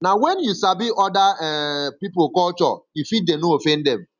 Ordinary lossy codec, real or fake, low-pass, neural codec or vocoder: none; real; 7.2 kHz; none